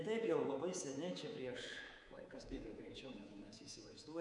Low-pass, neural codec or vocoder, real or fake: 10.8 kHz; codec, 24 kHz, 3.1 kbps, DualCodec; fake